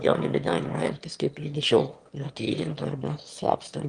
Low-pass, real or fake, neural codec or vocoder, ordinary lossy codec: 9.9 kHz; fake; autoencoder, 22.05 kHz, a latent of 192 numbers a frame, VITS, trained on one speaker; Opus, 24 kbps